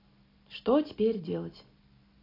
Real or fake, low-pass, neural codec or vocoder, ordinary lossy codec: real; 5.4 kHz; none; AAC, 24 kbps